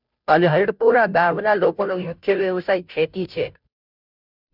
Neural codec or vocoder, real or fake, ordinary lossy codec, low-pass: codec, 16 kHz, 0.5 kbps, FunCodec, trained on Chinese and English, 25 frames a second; fake; none; 5.4 kHz